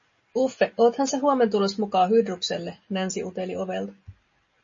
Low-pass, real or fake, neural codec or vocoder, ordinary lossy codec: 7.2 kHz; real; none; MP3, 32 kbps